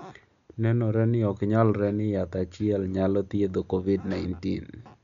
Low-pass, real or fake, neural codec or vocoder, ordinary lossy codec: 7.2 kHz; real; none; none